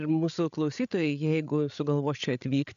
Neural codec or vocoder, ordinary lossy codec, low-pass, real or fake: codec, 16 kHz, 8 kbps, FreqCodec, larger model; MP3, 96 kbps; 7.2 kHz; fake